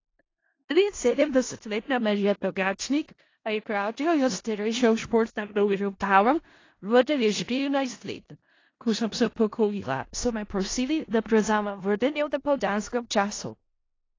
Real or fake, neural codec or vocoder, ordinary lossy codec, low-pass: fake; codec, 16 kHz in and 24 kHz out, 0.4 kbps, LongCat-Audio-Codec, four codebook decoder; AAC, 32 kbps; 7.2 kHz